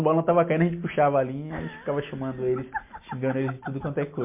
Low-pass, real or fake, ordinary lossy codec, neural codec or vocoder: 3.6 kHz; real; MP3, 24 kbps; none